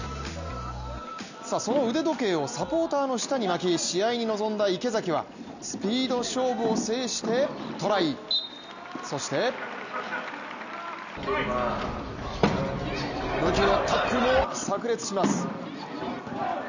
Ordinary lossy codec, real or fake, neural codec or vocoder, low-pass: none; real; none; 7.2 kHz